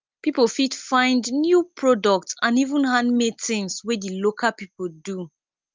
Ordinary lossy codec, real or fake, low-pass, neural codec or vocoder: Opus, 24 kbps; real; 7.2 kHz; none